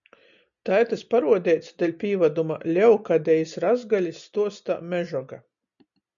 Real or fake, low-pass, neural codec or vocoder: real; 7.2 kHz; none